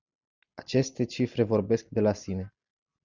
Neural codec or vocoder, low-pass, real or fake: none; 7.2 kHz; real